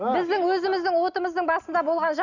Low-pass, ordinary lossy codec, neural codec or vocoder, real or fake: 7.2 kHz; none; none; real